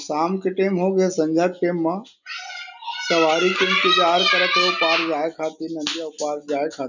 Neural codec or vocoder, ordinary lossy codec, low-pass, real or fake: none; none; 7.2 kHz; real